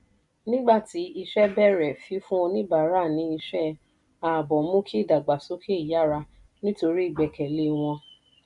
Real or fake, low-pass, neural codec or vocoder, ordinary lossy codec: real; 10.8 kHz; none; none